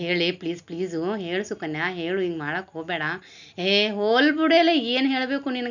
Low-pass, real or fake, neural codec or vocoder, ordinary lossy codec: 7.2 kHz; real; none; none